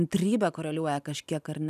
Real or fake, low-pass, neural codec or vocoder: real; 14.4 kHz; none